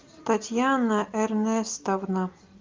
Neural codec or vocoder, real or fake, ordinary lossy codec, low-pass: none; real; Opus, 24 kbps; 7.2 kHz